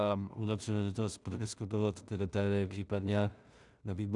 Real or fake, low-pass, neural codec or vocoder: fake; 10.8 kHz; codec, 16 kHz in and 24 kHz out, 0.4 kbps, LongCat-Audio-Codec, two codebook decoder